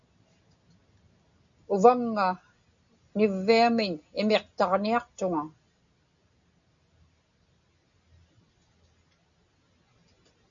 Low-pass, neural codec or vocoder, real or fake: 7.2 kHz; none; real